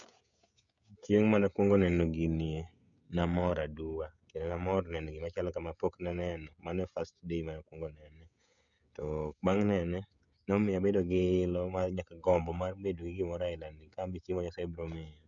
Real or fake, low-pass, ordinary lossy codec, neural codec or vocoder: fake; 7.2 kHz; none; codec, 16 kHz, 16 kbps, FreqCodec, smaller model